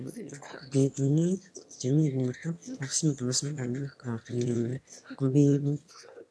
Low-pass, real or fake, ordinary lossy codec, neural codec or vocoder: none; fake; none; autoencoder, 22.05 kHz, a latent of 192 numbers a frame, VITS, trained on one speaker